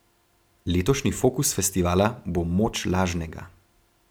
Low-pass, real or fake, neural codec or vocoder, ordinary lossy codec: none; real; none; none